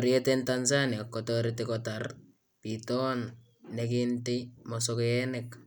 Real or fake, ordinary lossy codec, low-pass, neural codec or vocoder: real; none; none; none